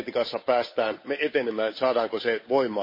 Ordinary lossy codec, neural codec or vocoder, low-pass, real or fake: MP3, 24 kbps; codec, 16 kHz, 8 kbps, FunCodec, trained on Chinese and English, 25 frames a second; 5.4 kHz; fake